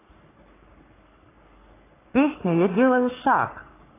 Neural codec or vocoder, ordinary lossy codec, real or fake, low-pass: codec, 44.1 kHz, 3.4 kbps, Pupu-Codec; AAC, 16 kbps; fake; 3.6 kHz